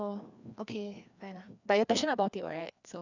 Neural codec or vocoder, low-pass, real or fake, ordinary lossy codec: codec, 16 kHz, 2 kbps, FreqCodec, larger model; 7.2 kHz; fake; none